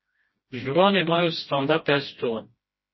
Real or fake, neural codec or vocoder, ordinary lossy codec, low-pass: fake; codec, 16 kHz, 1 kbps, FreqCodec, smaller model; MP3, 24 kbps; 7.2 kHz